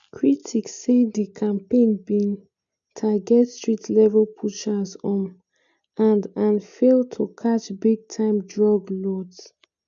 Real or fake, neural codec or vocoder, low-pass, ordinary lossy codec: real; none; 7.2 kHz; MP3, 96 kbps